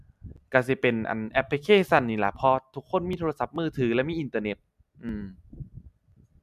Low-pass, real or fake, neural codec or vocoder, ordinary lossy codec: 14.4 kHz; real; none; none